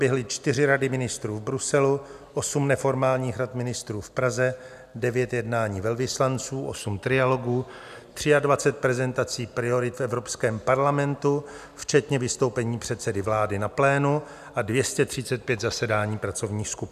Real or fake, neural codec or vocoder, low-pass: real; none; 14.4 kHz